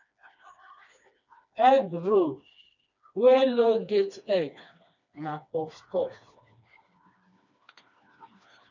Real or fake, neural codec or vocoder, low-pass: fake; codec, 16 kHz, 2 kbps, FreqCodec, smaller model; 7.2 kHz